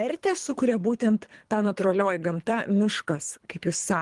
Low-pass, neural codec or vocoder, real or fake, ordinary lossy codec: 10.8 kHz; codec, 24 kHz, 3 kbps, HILCodec; fake; Opus, 24 kbps